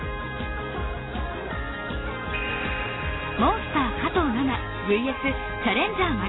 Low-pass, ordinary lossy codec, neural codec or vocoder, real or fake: 7.2 kHz; AAC, 16 kbps; none; real